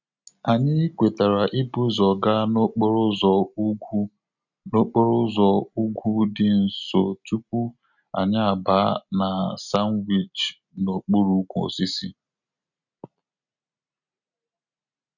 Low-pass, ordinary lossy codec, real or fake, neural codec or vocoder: 7.2 kHz; none; real; none